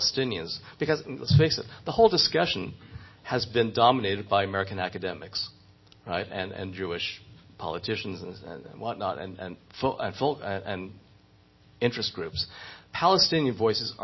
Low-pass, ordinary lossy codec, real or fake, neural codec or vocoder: 7.2 kHz; MP3, 24 kbps; real; none